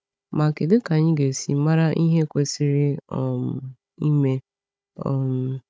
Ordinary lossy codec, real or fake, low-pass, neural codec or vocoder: none; fake; none; codec, 16 kHz, 16 kbps, FunCodec, trained on Chinese and English, 50 frames a second